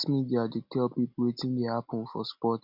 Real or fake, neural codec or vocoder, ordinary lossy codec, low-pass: real; none; none; 5.4 kHz